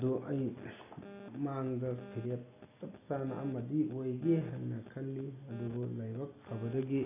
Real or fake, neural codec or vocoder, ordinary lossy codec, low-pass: real; none; none; 3.6 kHz